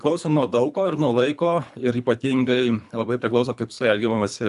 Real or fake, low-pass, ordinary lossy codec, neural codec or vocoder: fake; 10.8 kHz; AAC, 96 kbps; codec, 24 kHz, 3 kbps, HILCodec